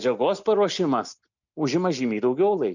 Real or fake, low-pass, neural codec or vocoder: real; 7.2 kHz; none